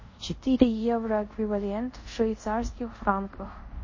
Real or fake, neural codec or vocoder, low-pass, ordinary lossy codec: fake; codec, 24 kHz, 0.5 kbps, DualCodec; 7.2 kHz; MP3, 32 kbps